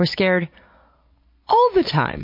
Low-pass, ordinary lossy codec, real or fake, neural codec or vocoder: 5.4 kHz; MP3, 32 kbps; real; none